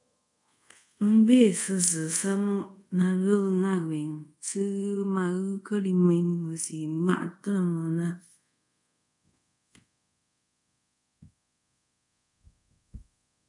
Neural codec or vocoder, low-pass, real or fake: codec, 24 kHz, 0.5 kbps, DualCodec; 10.8 kHz; fake